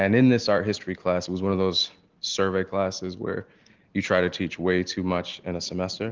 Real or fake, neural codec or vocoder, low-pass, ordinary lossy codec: real; none; 7.2 kHz; Opus, 16 kbps